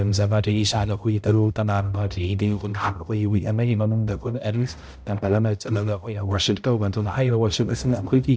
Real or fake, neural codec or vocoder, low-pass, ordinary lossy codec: fake; codec, 16 kHz, 0.5 kbps, X-Codec, HuBERT features, trained on balanced general audio; none; none